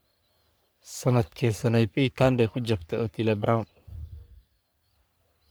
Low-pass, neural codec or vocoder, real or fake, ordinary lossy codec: none; codec, 44.1 kHz, 3.4 kbps, Pupu-Codec; fake; none